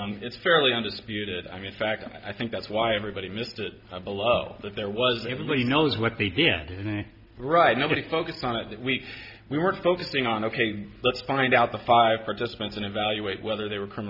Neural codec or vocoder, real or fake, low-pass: none; real; 5.4 kHz